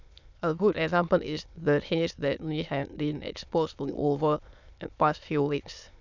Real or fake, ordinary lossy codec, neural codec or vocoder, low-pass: fake; none; autoencoder, 22.05 kHz, a latent of 192 numbers a frame, VITS, trained on many speakers; 7.2 kHz